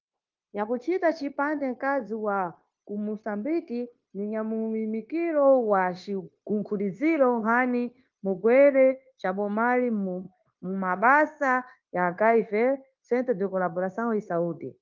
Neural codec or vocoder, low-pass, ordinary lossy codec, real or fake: codec, 16 kHz, 0.9 kbps, LongCat-Audio-Codec; 7.2 kHz; Opus, 24 kbps; fake